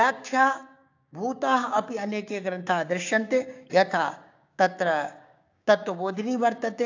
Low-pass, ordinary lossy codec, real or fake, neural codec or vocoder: 7.2 kHz; AAC, 48 kbps; fake; codec, 16 kHz, 6 kbps, DAC